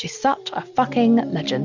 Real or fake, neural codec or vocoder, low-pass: real; none; 7.2 kHz